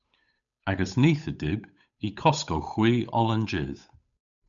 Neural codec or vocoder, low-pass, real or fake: codec, 16 kHz, 8 kbps, FunCodec, trained on Chinese and English, 25 frames a second; 7.2 kHz; fake